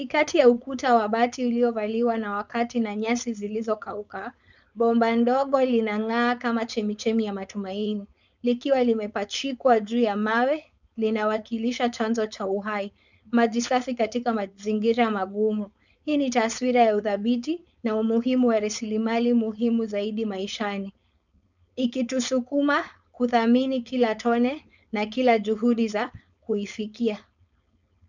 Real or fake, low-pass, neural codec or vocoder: fake; 7.2 kHz; codec, 16 kHz, 4.8 kbps, FACodec